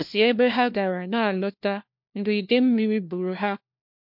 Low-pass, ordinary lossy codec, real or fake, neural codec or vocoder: 5.4 kHz; MP3, 48 kbps; fake; codec, 16 kHz, 1 kbps, FunCodec, trained on LibriTTS, 50 frames a second